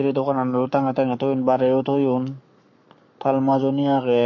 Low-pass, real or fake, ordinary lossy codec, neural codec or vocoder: 7.2 kHz; fake; MP3, 48 kbps; codec, 44.1 kHz, 7.8 kbps, Pupu-Codec